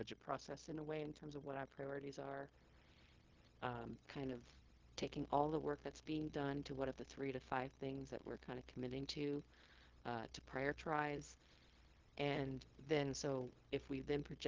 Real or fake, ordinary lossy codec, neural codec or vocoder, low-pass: fake; Opus, 16 kbps; codec, 16 kHz, 0.4 kbps, LongCat-Audio-Codec; 7.2 kHz